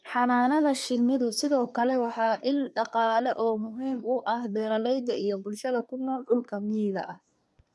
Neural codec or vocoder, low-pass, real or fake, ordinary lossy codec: codec, 24 kHz, 1 kbps, SNAC; none; fake; none